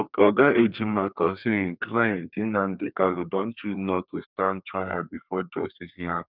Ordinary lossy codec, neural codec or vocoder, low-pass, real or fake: none; codec, 32 kHz, 1.9 kbps, SNAC; 5.4 kHz; fake